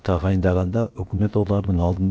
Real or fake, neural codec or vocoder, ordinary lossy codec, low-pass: fake; codec, 16 kHz, about 1 kbps, DyCAST, with the encoder's durations; none; none